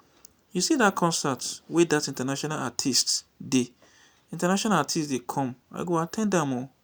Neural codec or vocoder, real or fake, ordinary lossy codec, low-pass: none; real; none; none